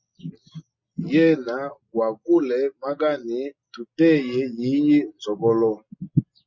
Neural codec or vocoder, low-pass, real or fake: none; 7.2 kHz; real